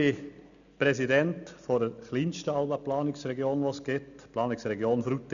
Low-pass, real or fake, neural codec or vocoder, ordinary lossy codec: 7.2 kHz; real; none; none